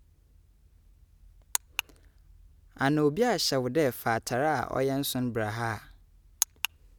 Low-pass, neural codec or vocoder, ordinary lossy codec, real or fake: none; none; none; real